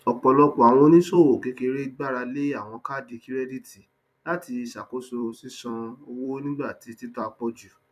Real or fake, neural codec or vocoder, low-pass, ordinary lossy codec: fake; vocoder, 44.1 kHz, 128 mel bands every 256 samples, BigVGAN v2; 14.4 kHz; none